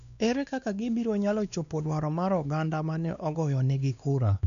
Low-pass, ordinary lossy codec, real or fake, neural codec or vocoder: 7.2 kHz; none; fake; codec, 16 kHz, 2 kbps, X-Codec, WavLM features, trained on Multilingual LibriSpeech